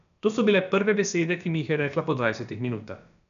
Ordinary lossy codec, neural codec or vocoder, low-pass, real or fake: none; codec, 16 kHz, about 1 kbps, DyCAST, with the encoder's durations; 7.2 kHz; fake